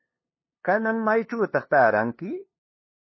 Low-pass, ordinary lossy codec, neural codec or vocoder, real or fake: 7.2 kHz; MP3, 24 kbps; codec, 16 kHz, 2 kbps, FunCodec, trained on LibriTTS, 25 frames a second; fake